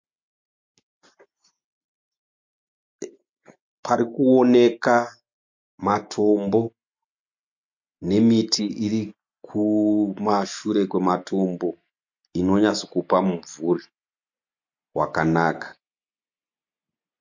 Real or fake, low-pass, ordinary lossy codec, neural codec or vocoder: real; 7.2 kHz; MP3, 48 kbps; none